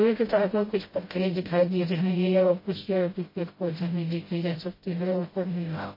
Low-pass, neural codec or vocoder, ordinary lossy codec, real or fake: 5.4 kHz; codec, 16 kHz, 0.5 kbps, FreqCodec, smaller model; MP3, 24 kbps; fake